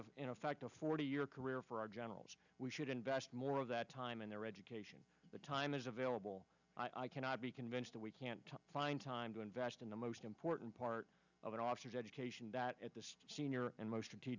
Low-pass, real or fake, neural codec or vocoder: 7.2 kHz; real; none